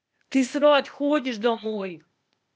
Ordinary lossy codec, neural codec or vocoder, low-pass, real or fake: none; codec, 16 kHz, 0.8 kbps, ZipCodec; none; fake